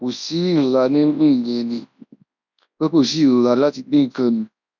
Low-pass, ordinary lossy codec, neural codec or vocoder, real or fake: 7.2 kHz; none; codec, 24 kHz, 0.9 kbps, WavTokenizer, large speech release; fake